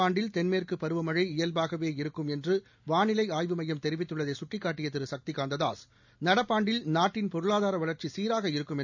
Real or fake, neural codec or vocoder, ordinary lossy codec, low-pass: real; none; none; 7.2 kHz